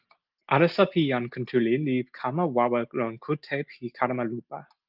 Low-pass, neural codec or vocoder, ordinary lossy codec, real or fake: 5.4 kHz; none; Opus, 24 kbps; real